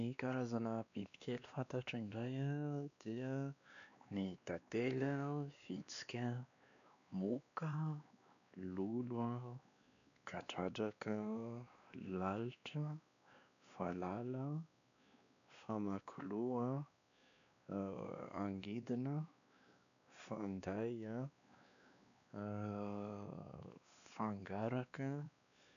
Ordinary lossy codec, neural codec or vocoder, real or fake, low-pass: none; codec, 16 kHz, 2 kbps, X-Codec, WavLM features, trained on Multilingual LibriSpeech; fake; 7.2 kHz